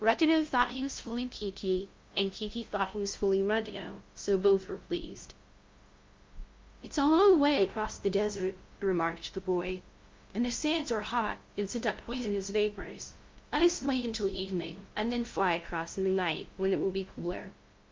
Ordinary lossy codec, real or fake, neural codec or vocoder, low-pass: Opus, 24 kbps; fake; codec, 16 kHz, 0.5 kbps, FunCodec, trained on LibriTTS, 25 frames a second; 7.2 kHz